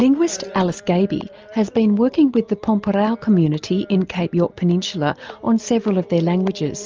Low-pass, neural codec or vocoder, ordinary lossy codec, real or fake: 7.2 kHz; none; Opus, 24 kbps; real